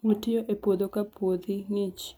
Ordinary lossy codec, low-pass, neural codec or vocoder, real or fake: none; none; none; real